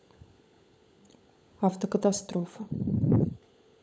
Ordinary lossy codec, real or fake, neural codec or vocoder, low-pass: none; fake; codec, 16 kHz, 16 kbps, FunCodec, trained on LibriTTS, 50 frames a second; none